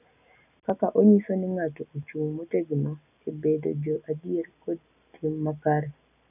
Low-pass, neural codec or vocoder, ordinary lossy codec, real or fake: 3.6 kHz; none; none; real